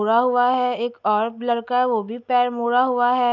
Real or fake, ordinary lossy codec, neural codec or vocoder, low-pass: real; none; none; 7.2 kHz